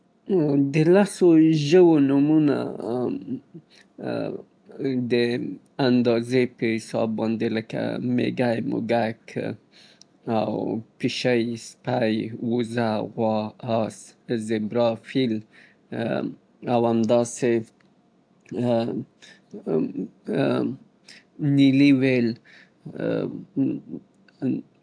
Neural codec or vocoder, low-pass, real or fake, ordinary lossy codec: none; 9.9 kHz; real; AAC, 64 kbps